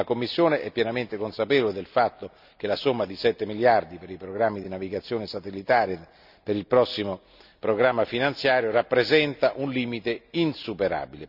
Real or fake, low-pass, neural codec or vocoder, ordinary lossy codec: real; 5.4 kHz; none; none